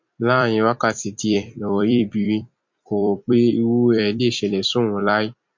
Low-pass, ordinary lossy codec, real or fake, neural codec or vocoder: 7.2 kHz; MP3, 48 kbps; fake; vocoder, 44.1 kHz, 128 mel bands every 256 samples, BigVGAN v2